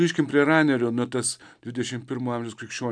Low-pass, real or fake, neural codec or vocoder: 9.9 kHz; real; none